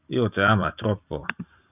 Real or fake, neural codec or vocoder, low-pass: fake; vocoder, 44.1 kHz, 128 mel bands every 256 samples, BigVGAN v2; 3.6 kHz